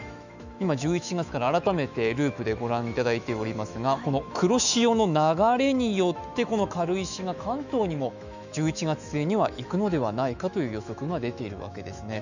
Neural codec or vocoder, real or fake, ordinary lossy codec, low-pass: autoencoder, 48 kHz, 128 numbers a frame, DAC-VAE, trained on Japanese speech; fake; none; 7.2 kHz